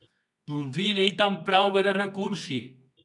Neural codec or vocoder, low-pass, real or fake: codec, 24 kHz, 0.9 kbps, WavTokenizer, medium music audio release; 10.8 kHz; fake